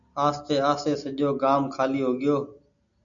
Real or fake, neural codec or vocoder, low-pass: real; none; 7.2 kHz